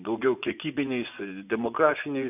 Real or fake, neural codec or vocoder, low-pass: fake; vocoder, 24 kHz, 100 mel bands, Vocos; 3.6 kHz